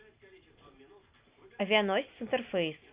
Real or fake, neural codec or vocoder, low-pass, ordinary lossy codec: real; none; 3.6 kHz; Opus, 64 kbps